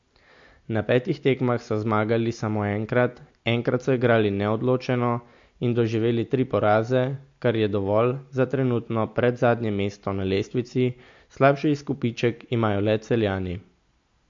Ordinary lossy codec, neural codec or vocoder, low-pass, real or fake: MP3, 48 kbps; none; 7.2 kHz; real